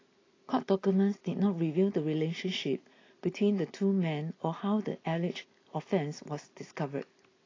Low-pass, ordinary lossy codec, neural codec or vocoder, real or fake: 7.2 kHz; AAC, 32 kbps; none; real